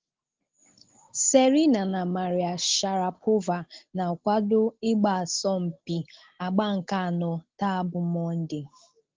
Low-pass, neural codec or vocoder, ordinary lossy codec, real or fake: 7.2 kHz; none; Opus, 16 kbps; real